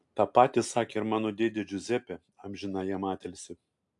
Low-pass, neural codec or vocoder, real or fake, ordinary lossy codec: 10.8 kHz; none; real; AAC, 64 kbps